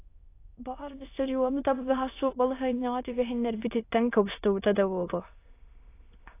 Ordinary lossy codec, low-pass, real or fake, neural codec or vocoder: AAC, 24 kbps; 3.6 kHz; fake; autoencoder, 22.05 kHz, a latent of 192 numbers a frame, VITS, trained on many speakers